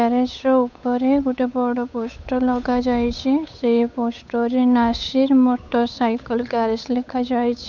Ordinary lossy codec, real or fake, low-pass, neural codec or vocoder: none; fake; 7.2 kHz; codec, 16 kHz, 8 kbps, FunCodec, trained on Chinese and English, 25 frames a second